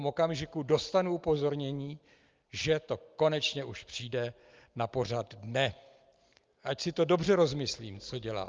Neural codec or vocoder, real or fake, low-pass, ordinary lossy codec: none; real; 7.2 kHz; Opus, 32 kbps